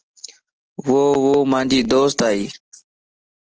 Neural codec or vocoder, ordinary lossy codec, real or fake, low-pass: none; Opus, 16 kbps; real; 7.2 kHz